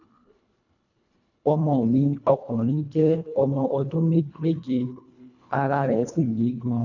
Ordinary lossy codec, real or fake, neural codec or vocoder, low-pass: none; fake; codec, 24 kHz, 1.5 kbps, HILCodec; 7.2 kHz